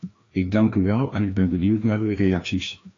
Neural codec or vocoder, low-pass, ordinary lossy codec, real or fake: codec, 16 kHz, 1 kbps, FreqCodec, larger model; 7.2 kHz; AAC, 32 kbps; fake